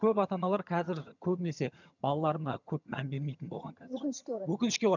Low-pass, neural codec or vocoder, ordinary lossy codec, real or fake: 7.2 kHz; vocoder, 22.05 kHz, 80 mel bands, HiFi-GAN; none; fake